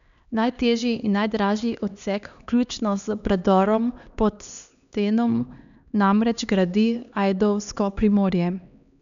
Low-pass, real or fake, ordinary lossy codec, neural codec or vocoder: 7.2 kHz; fake; none; codec, 16 kHz, 2 kbps, X-Codec, HuBERT features, trained on LibriSpeech